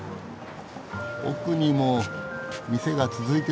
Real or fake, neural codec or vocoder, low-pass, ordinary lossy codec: real; none; none; none